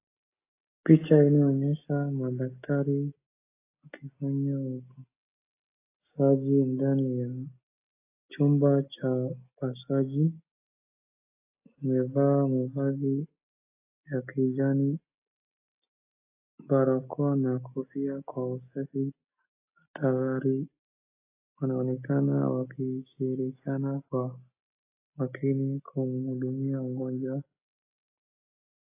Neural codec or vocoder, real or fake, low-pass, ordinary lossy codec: none; real; 3.6 kHz; AAC, 24 kbps